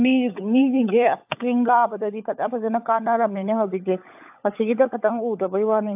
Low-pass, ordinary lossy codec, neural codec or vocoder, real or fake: 3.6 kHz; AAC, 32 kbps; codec, 16 kHz, 16 kbps, FunCodec, trained on LibriTTS, 50 frames a second; fake